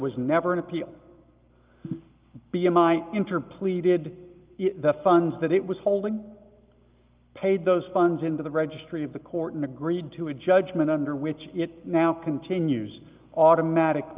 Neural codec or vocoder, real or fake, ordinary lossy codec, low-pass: none; real; Opus, 32 kbps; 3.6 kHz